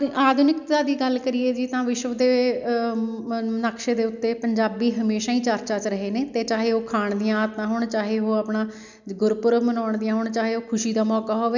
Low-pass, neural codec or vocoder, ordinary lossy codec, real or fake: 7.2 kHz; none; none; real